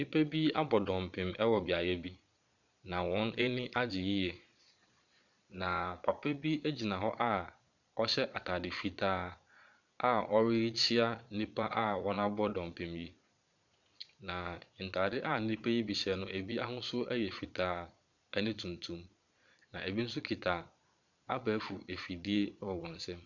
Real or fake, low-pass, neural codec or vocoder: fake; 7.2 kHz; vocoder, 22.05 kHz, 80 mel bands, Vocos